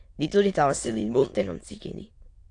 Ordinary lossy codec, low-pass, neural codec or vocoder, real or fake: AAC, 48 kbps; 9.9 kHz; autoencoder, 22.05 kHz, a latent of 192 numbers a frame, VITS, trained on many speakers; fake